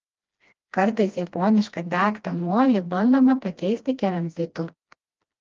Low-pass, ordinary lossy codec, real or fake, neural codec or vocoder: 7.2 kHz; Opus, 24 kbps; fake; codec, 16 kHz, 1 kbps, FreqCodec, smaller model